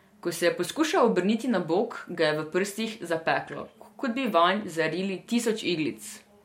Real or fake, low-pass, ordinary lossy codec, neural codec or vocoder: real; 19.8 kHz; MP3, 64 kbps; none